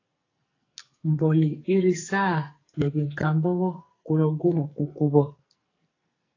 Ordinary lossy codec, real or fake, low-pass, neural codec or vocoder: AAC, 32 kbps; fake; 7.2 kHz; codec, 44.1 kHz, 2.6 kbps, SNAC